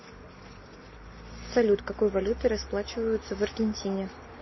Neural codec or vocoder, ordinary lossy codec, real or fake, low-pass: none; MP3, 24 kbps; real; 7.2 kHz